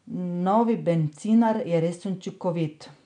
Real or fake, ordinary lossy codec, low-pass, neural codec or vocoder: real; none; 9.9 kHz; none